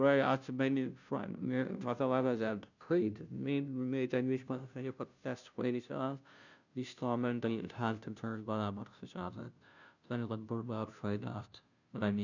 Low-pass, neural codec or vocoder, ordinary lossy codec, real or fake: 7.2 kHz; codec, 16 kHz, 0.5 kbps, FunCodec, trained on Chinese and English, 25 frames a second; none; fake